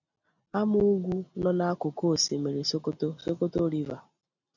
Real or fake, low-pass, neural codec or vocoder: real; 7.2 kHz; none